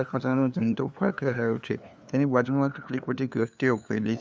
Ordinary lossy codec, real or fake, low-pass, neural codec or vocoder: none; fake; none; codec, 16 kHz, 2 kbps, FunCodec, trained on LibriTTS, 25 frames a second